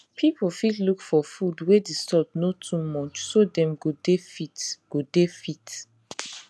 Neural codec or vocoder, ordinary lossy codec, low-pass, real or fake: none; none; none; real